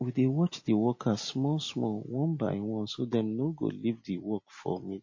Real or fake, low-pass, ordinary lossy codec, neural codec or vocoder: real; 7.2 kHz; MP3, 32 kbps; none